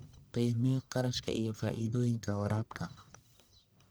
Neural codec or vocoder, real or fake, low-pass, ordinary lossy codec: codec, 44.1 kHz, 1.7 kbps, Pupu-Codec; fake; none; none